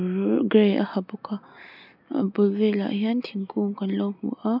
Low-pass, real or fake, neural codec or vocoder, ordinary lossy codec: 5.4 kHz; real; none; none